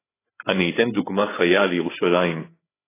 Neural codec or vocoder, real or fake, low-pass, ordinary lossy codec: codec, 16 kHz, 8 kbps, FreqCodec, larger model; fake; 3.6 kHz; AAC, 16 kbps